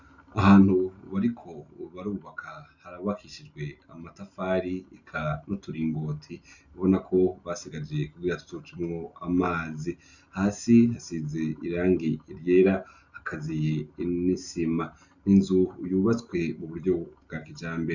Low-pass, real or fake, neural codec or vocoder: 7.2 kHz; real; none